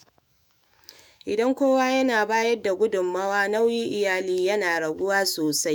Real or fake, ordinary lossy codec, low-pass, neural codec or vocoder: fake; none; 19.8 kHz; autoencoder, 48 kHz, 128 numbers a frame, DAC-VAE, trained on Japanese speech